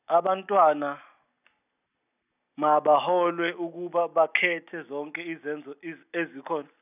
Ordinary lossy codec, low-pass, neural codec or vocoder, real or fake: none; 3.6 kHz; none; real